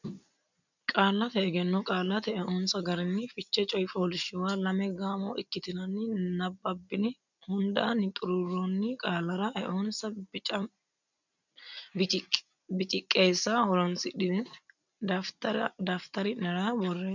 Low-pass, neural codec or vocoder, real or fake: 7.2 kHz; none; real